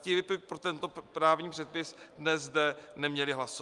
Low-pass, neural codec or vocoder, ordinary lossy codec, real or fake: 10.8 kHz; none; Opus, 32 kbps; real